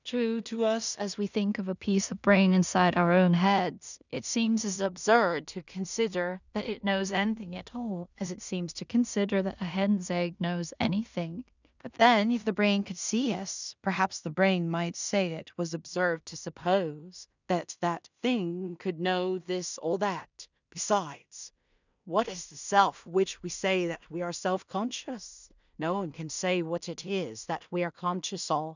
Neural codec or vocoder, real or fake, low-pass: codec, 16 kHz in and 24 kHz out, 0.4 kbps, LongCat-Audio-Codec, two codebook decoder; fake; 7.2 kHz